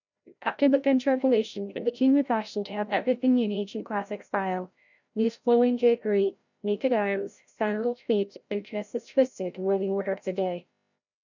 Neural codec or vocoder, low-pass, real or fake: codec, 16 kHz, 0.5 kbps, FreqCodec, larger model; 7.2 kHz; fake